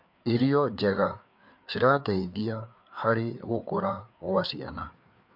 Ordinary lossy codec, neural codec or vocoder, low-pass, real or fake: MP3, 48 kbps; codec, 16 kHz, 4 kbps, FreqCodec, larger model; 5.4 kHz; fake